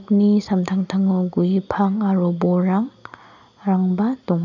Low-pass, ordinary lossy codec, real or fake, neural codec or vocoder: 7.2 kHz; none; real; none